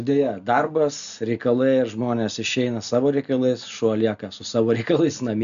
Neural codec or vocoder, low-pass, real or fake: none; 7.2 kHz; real